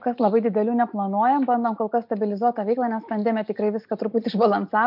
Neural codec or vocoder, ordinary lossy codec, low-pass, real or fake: none; MP3, 48 kbps; 5.4 kHz; real